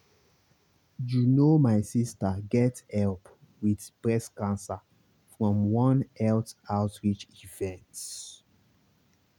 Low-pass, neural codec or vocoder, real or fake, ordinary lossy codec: 19.8 kHz; none; real; none